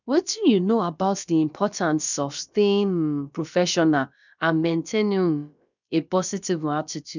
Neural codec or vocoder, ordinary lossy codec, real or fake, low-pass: codec, 16 kHz, about 1 kbps, DyCAST, with the encoder's durations; none; fake; 7.2 kHz